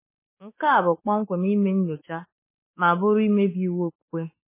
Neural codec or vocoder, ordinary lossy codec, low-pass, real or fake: autoencoder, 48 kHz, 32 numbers a frame, DAC-VAE, trained on Japanese speech; MP3, 16 kbps; 3.6 kHz; fake